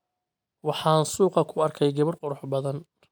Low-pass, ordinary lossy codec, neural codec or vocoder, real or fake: none; none; none; real